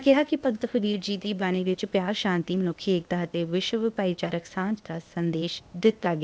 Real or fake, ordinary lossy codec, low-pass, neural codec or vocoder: fake; none; none; codec, 16 kHz, 0.8 kbps, ZipCodec